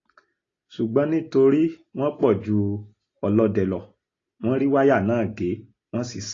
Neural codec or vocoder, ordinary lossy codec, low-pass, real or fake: none; AAC, 32 kbps; 7.2 kHz; real